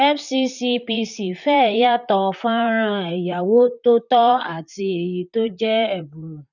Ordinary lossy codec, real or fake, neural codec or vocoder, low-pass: none; fake; vocoder, 44.1 kHz, 128 mel bands, Pupu-Vocoder; 7.2 kHz